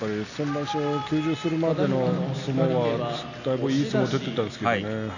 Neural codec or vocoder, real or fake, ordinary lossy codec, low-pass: none; real; none; 7.2 kHz